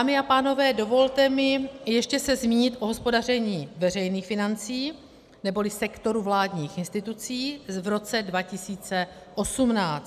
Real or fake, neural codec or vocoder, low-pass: real; none; 14.4 kHz